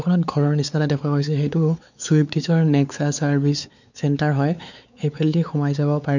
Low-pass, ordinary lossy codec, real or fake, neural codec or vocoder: 7.2 kHz; none; fake; codec, 16 kHz, 4 kbps, X-Codec, WavLM features, trained on Multilingual LibriSpeech